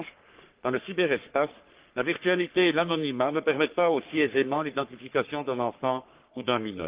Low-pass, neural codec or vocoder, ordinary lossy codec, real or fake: 3.6 kHz; codec, 44.1 kHz, 3.4 kbps, Pupu-Codec; Opus, 32 kbps; fake